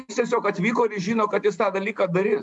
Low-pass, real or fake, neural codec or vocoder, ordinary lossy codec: 10.8 kHz; real; none; MP3, 96 kbps